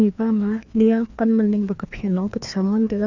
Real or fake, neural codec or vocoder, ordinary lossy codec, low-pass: fake; codec, 16 kHz, 2 kbps, FreqCodec, larger model; none; 7.2 kHz